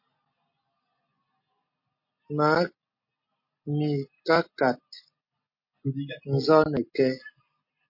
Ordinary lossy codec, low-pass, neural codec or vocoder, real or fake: MP3, 32 kbps; 5.4 kHz; none; real